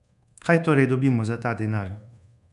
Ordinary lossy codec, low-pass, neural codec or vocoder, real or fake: none; 10.8 kHz; codec, 24 kHz, 1.2 kbps, DualCodec; fake